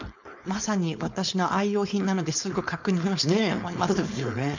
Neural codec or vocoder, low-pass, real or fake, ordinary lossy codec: codec, 16 kHz, 4.8 kbps, FACodec; 7.2 kHz; fake; none